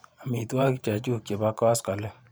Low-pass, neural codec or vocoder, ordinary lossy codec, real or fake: none; vocoder, 44.1 kHz, 128 mel bands every 512 samples, BigVGAN v2; none; fake